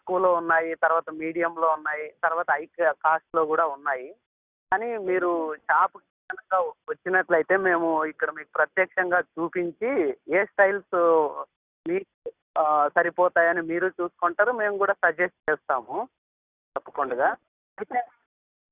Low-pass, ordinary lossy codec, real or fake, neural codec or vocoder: 3.6 kHz; Opus, 64 kbps; real; none